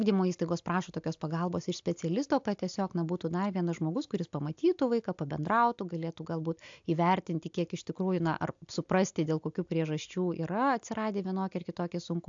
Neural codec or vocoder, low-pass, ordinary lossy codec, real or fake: none; 7.2 kHz; AAC, 64 kbps; real